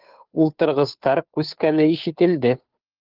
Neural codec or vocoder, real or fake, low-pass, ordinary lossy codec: codec, 16 kHz, 2 kbps, FunCodec, trained on Chinese and English, 25 frames a second; fake; 5.4 kHz; Opus, 32 kbps